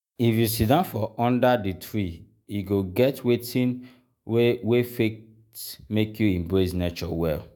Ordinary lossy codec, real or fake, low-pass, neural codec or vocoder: none; fake; none; autoencoder, 48 kHz, 128 numbers a frame, DAC-VAE, trained on Japanese speech